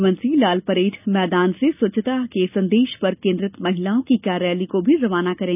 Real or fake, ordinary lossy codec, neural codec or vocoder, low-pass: real; none; none; 3.6 kHz